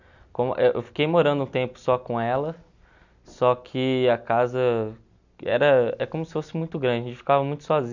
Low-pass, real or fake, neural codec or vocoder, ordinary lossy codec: 7.2 kHz; real; none; none